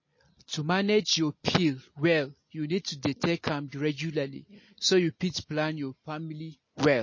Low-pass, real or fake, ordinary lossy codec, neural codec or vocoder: 7.2 kHz; real; MP3, 32 kbps; none